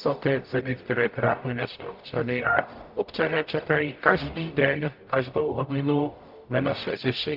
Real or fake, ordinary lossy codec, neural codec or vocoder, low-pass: fake; Opus, 24 kbps; codec, 44.1 kHz, 0.9 kbps, DAC; 5.4 kHz